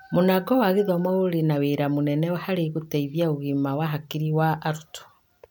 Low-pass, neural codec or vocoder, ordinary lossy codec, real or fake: none; none; none; real